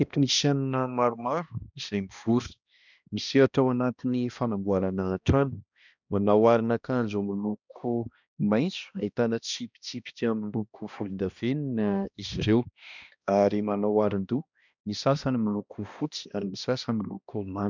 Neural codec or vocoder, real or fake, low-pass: codec, 16 kHz, 1 kbps, X-Codec, HuBERT features, trained on balanced general audio; fake; 7.2 kHz